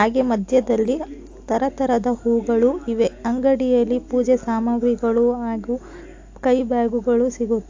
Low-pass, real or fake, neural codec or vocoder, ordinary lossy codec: 7.2 kHz; real; none; AAC, 48 kbps